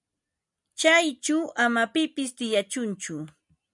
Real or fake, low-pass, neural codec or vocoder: real; 10.8 kHz; none